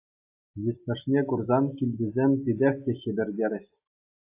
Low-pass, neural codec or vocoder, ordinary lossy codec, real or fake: 3.6 kHz; none; AAC, 32 kbps; real